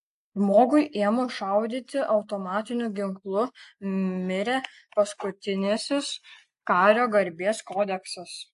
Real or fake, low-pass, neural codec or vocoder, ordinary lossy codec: real; 10.8 kHz; none; AAC, 64 kbps